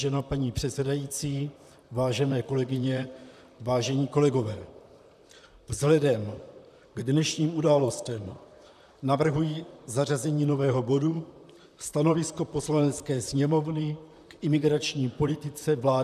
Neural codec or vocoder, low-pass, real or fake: vocoder, 44.1 kHz, 128 mel bands, Pupu-Vocoder; 14.4 kHz; fake